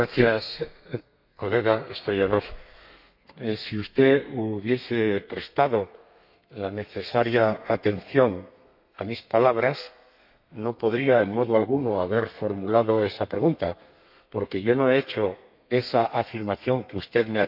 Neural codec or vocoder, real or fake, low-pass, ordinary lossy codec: codec, 32 kHz, 1.9 kbps, SNAC; fake; 5.4 kHz; MP3, 48 kbps